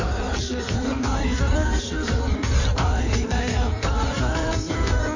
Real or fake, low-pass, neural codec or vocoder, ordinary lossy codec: fake; 7.2 kHz; codec, 16 kHz in and 24 kHz out, 1.1 kbps, FireRedTTS-2 codec; none